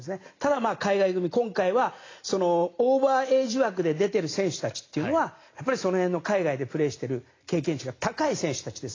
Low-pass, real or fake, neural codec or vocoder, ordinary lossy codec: 7.2 kHz; real; none; AAC, 32 kbps